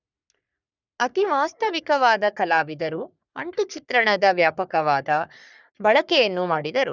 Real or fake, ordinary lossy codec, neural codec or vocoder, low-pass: fake; none; codec, 44.1 kHz, 3.4 kbps, Pupu-Codec; 7.2 kHz